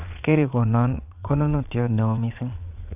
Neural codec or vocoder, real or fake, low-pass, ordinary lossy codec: vocoder, 22.05 kHz, 80 mel bands, Vocos; fake; 3.6 kHz; none